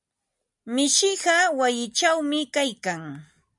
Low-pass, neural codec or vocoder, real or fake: 10.8 kHz; none; real